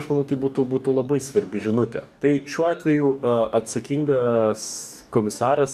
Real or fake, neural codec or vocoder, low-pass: fake; codec, 44.1 kHz, 2.6 kbps, DAC; 14.4 kHz